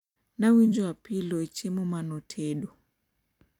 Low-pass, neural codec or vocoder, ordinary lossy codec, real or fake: 19.8 kHz; vocoder, 44.1 kHz, 128 mel bands every 256 samples, BigVGAN v2; none; fake